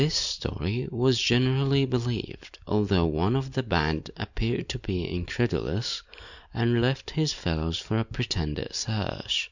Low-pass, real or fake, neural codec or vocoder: 7.2 kHz; real; none